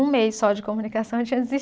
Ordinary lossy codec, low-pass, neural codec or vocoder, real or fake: none; none; none; real